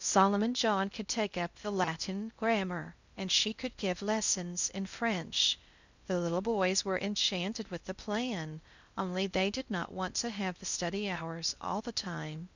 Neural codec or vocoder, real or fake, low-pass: codec, 16 kHz in and 24 kHz out, 0.6 kbps, FocalCodec, streaming, 2048 codes; fake; 7.2 kHz